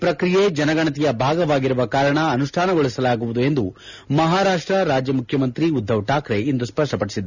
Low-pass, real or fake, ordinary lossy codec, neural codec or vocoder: 7.2 kHz; real; none; none